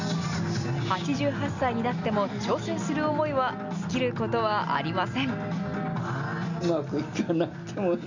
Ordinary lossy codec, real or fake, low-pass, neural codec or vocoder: none; real; 7.2 kHz; none